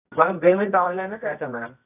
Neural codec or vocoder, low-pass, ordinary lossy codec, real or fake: codec, 24 kHz, 0.9 kbps, WavTokenizer, medium music audio release; 3.6 kHz; none; fake